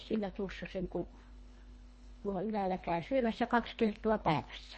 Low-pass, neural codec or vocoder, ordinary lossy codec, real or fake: 10.8 kHz; codec, 24 kHz, 1.5 kbps, HILCodec; MP3, 32 kbps; fake